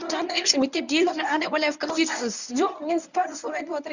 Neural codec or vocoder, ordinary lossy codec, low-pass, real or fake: codec, 24 kHz, 0.9 kbps, WavTokenizer, medium speech release version 1; none; 7.2 kHz; fake